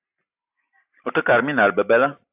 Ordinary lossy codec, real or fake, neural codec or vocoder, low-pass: AAC, 32 kbps; real; none; 3.6 kHz